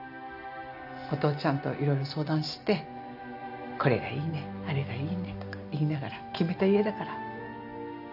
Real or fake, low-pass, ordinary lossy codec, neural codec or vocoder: real; 5.4 kHz; none; none